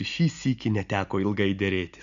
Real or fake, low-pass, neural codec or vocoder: real; 7.2 kHz; none